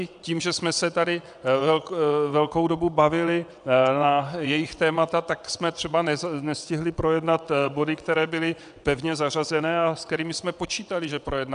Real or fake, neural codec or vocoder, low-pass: fake; vocoder, 22.05 kHz, 80 mel bands, WaveNeXt; 9.9 kHz